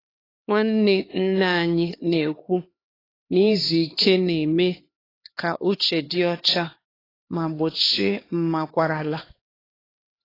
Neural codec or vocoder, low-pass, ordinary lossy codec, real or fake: codec, 16 kHz, 2 kbps, X-Codec, HuBERT features, trained on LibriSpeech; 5.4 kHz; AAC, 24 kbps; fake